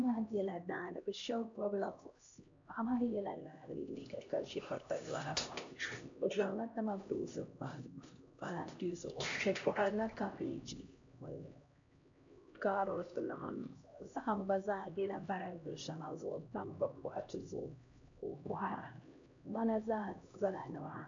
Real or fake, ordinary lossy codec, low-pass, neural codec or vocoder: fake; MP3, 64 kbps; 7.2 kHz; codec, 16 kHz, 1 kbps, X-Codec, HuBERT features, trained on LibriSpeech